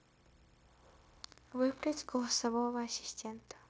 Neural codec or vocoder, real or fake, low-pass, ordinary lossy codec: codec, 16 kHz, 0.9 kbps, LongCat-Audio-Codec; fake; none; none